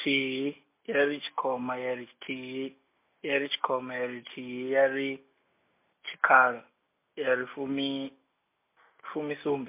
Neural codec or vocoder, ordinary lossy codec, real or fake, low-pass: none; MP3, 24 kbps; real; 3.6 kHz